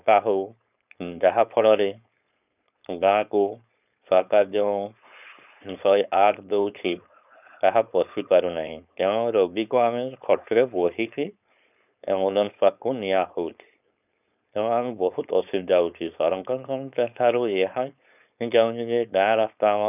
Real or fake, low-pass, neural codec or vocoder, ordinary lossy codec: fake; 3.6 kHz; codec, 16 kHz, 4.8 kbps, FACodec; none